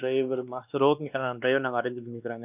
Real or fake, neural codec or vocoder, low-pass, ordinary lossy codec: fake; codec, 16 kHz, 2 kbps, X-Codec, WavLM features, trained on Multilingual LibriSpeech; 3.6 kHz; none